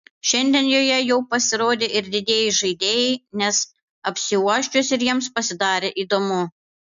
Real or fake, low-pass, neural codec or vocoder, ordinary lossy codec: real; 7.2 kHz; none; MP3, 64 kbps